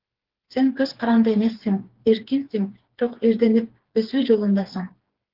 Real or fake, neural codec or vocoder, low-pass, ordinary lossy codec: fake; codec, 16 kHz, 4 kbps, FreqCodec, smaller model; 5.4 kHz; Opus, 16 kbps